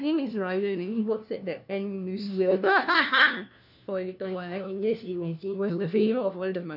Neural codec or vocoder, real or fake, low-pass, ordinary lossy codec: codec, 16 kHz, 1 kbps, FunCodec, trained on LibriTTS, 50 frames a second; fake; 5.4 kHz; none